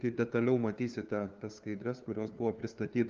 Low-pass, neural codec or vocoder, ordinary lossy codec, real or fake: 7.2 kHz; codec, 16 kHz, 2 kbps, FunCodec, trained on LibriTTS, 25 frames a second; Opus, 24 kbps; fake